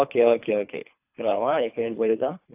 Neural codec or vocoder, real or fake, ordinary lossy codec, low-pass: codec, 24 kHz, 3 kbps, HILCodec; fake; AAC, 32 kbps; 3.6 kHz